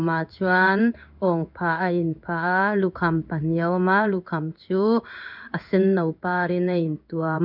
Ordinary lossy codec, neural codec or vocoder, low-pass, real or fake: none; codec, 16 kHz in and 24 kHz out, 1 kbps, XY-Tokenizer; 5.4 kHz; fake